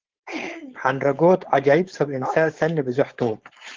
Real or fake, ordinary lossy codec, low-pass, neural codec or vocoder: fake; Opus, 16 kbps; 7.2 kHz; codec, 16 kHz, 4.8 kbps, FACodec